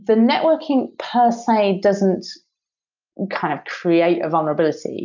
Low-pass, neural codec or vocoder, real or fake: 7.2 kHz; none; real